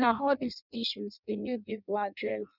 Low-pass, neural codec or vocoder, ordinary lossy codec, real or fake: 5.4 kHz; codec, 16 kHz in and 24 kHz out, 0.6 kbps, FireRedTTS-2 codec; none; fake